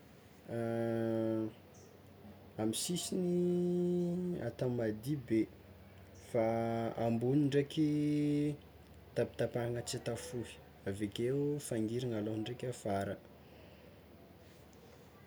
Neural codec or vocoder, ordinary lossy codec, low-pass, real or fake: none; none; none; real